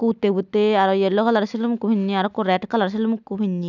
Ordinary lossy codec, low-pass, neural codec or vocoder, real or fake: none; 7.2 kHz; none; real